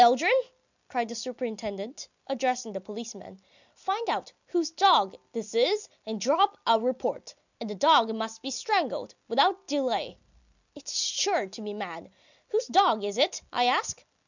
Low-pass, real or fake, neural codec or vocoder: 7.2 kHz; real; none